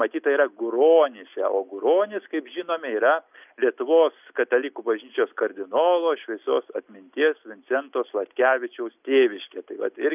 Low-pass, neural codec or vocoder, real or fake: 3.6 kHz; none; real